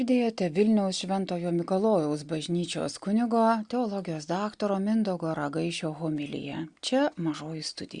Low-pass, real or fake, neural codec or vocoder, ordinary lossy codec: 9.9 kHz; real; none; Opus, 64 kbps